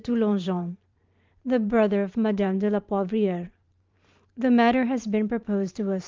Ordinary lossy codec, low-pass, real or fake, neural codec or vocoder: Opus, 24 kbps; 7.2 kHz; fake; vocoder, 44.1 kHz, 128 mel bands every 512 samples, BigVGAN v2